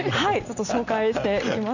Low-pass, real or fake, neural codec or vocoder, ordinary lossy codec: 7.2 kHz; real; none; none